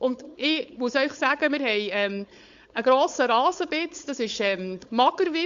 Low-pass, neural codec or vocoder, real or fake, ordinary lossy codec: 7.2 kHz; codec, 16 kHz, 4.8 kbps, FACodec; fake; Opus, 64 kbps